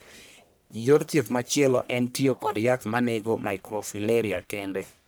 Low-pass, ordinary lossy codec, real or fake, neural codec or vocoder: none; none; fake; codec, 44.1 kHz, 1.7 kbps, Pupu-Codec